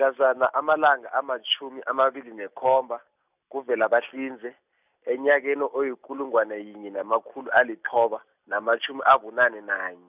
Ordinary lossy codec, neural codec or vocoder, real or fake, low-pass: none; none; real; 3.6 kHz